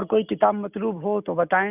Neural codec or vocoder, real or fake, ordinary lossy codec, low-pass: none; real; none; 3.6 kHz